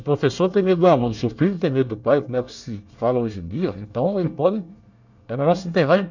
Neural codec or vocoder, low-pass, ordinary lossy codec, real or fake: codec, 24 kHz, 1 kbps, SNAC; 7.2 kHz; none; fake